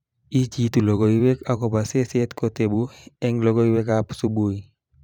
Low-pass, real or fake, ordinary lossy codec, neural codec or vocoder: 19.8 kHz; fake; none; vocoder, 44.1 kHz, 128 mel bands every 512 samples, BigVGAN v2